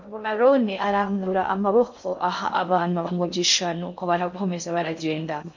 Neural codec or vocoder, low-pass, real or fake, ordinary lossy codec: codec, 16 kHz in and 24 kHz out, 0.6 kbps, FocalCodec, streaming, 2048 codes; 7.2 kHz; fake; MP3, 48 kbps